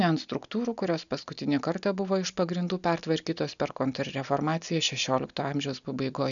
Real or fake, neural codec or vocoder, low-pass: real; none; 7.2 kHz